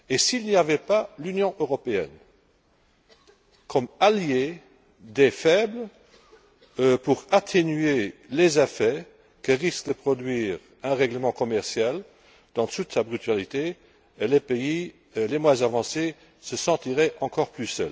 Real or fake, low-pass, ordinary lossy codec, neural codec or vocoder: real; none; none; none